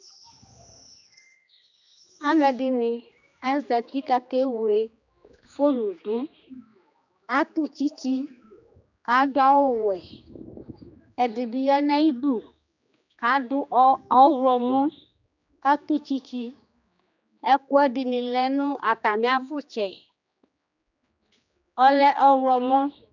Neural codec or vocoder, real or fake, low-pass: codec, 16 kHz, 2 kbps, X-Codec, HuBERT features, trained on general audio; fake; 7.2 kHz